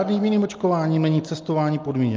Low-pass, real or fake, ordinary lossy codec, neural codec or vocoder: 7.2 kHz; real; Opus, 24 kbps; none